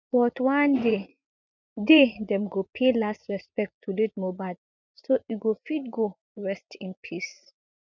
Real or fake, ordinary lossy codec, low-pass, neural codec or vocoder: fake; none; 7.2 kHz; vocoder, 24 kHz, 100 mel bands, Vocos